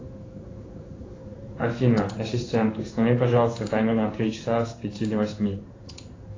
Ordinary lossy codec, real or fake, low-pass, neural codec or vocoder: AAC, 32 kbps; fake; 7.2 kHz; codec, 16 kHz in and 24 kHz out, 1 kbps, XY-Tokenizer